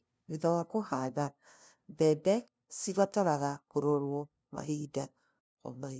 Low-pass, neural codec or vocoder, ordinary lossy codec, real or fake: none; codec, 16 kHz, 0.5 kbps, FunCodec, trained on LibriTTS, 25 frames a second; none; fake